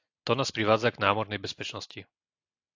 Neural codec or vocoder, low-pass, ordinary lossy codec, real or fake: none; 7.2 kHz; AAC, 48 kbps; real